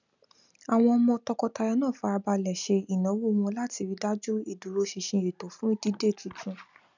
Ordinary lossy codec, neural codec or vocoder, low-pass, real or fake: none; none; 7.2 kHz; real